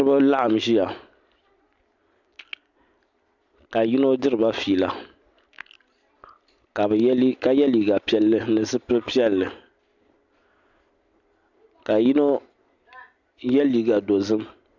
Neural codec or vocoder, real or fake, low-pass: none; real; 7.2 kHz